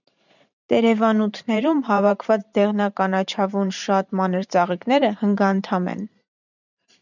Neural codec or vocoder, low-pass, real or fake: vocoder, 44.1 kHz, 128 mel bands every 512 samples, BigVGAN v2; 7.2 kHz; fake